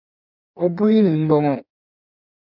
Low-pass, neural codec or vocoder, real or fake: 5.4 kHz; codec, 16 kHz in and 24 kHz out, 1.1 kbps, FireRedTTS-2 codec; fake